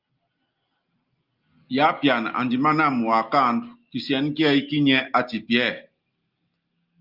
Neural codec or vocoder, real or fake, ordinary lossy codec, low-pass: none; real; Opus, 24 kbps; 5.4 kHz